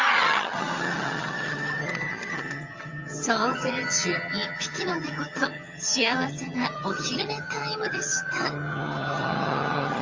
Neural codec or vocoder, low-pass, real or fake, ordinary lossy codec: vocoder, 22.05 kHz, 80 mel bands, HiFi-GAN; 7.2 kHz; fake; Opus, 32 kbps